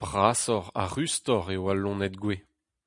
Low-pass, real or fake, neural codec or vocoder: 10.8 kHz; real; none